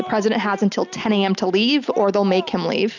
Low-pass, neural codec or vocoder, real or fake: 7.2 kHz; none; real